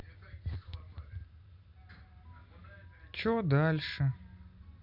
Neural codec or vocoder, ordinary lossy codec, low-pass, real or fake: none; none; 5.4 kHz; real